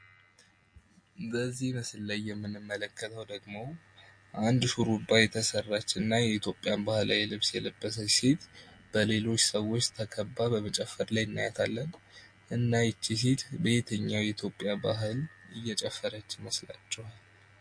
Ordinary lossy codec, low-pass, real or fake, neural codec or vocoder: MP3, 48 kbps; 9.9 kHz; real; none